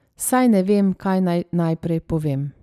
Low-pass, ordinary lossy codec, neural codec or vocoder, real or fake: 14.4 kHz; none; none; real